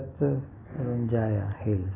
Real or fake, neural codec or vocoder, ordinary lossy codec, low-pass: real; none; AAC, 32 kbps; 3.6 kHz